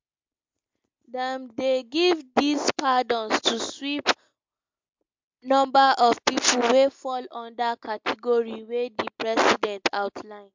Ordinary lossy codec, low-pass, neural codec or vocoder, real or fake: MP3, 64 kbps; 7.2 kHz; none; real